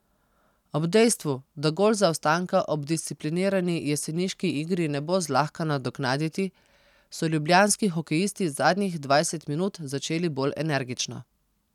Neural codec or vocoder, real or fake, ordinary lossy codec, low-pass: none; real; none; 19.8 kHz